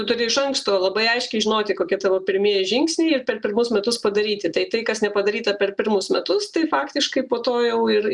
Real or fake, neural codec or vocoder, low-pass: real; none; 10.8 kHz